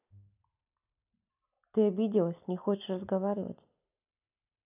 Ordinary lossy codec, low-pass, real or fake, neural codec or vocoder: none; 3.6 kHz; real; none